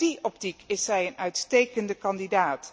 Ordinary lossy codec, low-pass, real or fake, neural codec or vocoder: none; none; real; none